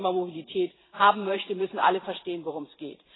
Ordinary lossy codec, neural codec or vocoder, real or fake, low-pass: AAC, 16 kbps; vocoder, 44.1 kHz, 128 mel bands every 512 samples, BigVGAN v2; fake; 7.2 kHz